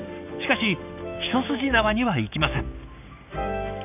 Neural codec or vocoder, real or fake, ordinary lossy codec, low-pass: codec, 16 kHz, 6 kbps, DAC; fake; none; 3.6 kHz